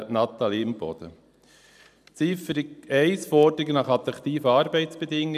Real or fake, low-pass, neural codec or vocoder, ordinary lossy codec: fake; 14.4 kHz; vocoder, 44.1 kHz, 128 mel bands every 512 samples, BigVGAN v2; none